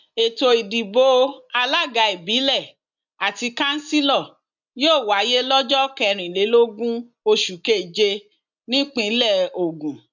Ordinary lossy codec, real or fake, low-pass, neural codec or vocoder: none; real; 7.2 kHz; none